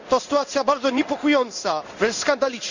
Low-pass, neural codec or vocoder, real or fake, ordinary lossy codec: 7.2 kHz; codec, 16 kHz in and 24 kHz out, 1 kbps, XY-Tokenizer; fake; none